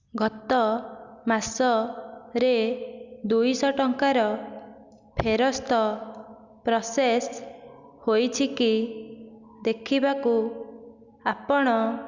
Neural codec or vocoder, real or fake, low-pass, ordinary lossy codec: none; real; 7.2 kHz; Opus, 64 kbps